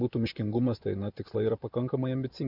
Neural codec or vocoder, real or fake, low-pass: vocoder, 44.1 kHz, 128 mel bands every 512 samples, BigVGAN v2; fake; 5.4 kHz